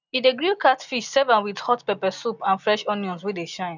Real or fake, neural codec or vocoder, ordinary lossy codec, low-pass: real; none; none; 7.2 kHz